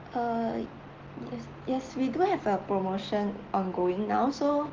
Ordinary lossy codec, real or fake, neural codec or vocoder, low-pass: Opus, 16 kbps; real; none; 7.2 kHz